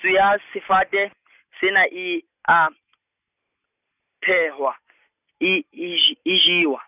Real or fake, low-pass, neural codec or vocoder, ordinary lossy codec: real; 3.6 kHz; none; none